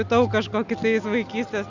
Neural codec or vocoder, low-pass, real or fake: none; 7.2 kHz; real